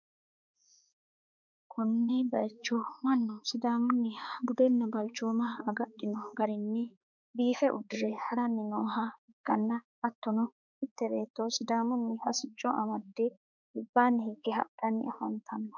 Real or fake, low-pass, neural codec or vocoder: fake; 7.2 kHz; codec, 16 kHz, 4 kbps, X-Codec, HuBERT features, trained on balanced general audio